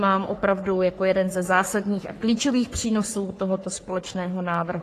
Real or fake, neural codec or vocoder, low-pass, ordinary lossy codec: fake; codec, 44.1 kHz, 3.4 kbps, Pupu-Codec; 14.4 kHz; AAC, 48 kbps